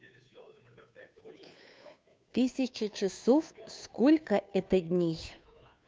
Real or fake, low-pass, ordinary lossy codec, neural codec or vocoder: fake; none; none; codec, 16 kHz, 2 kbps, FunCodec, trained on Chinese and English, 25 frames a second